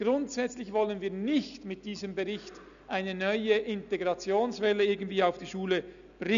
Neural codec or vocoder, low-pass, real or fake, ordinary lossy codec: none; 7.2 kHz; real; none